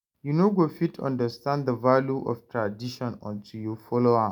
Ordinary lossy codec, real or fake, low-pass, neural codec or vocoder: none; real; 19.8 kHz; none